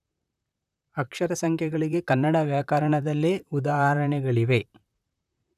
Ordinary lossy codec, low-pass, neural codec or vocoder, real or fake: none; 14.4 kHz; vocoder, 44.1 kHz, 128 mel bands, Pupu-Vocoder; fake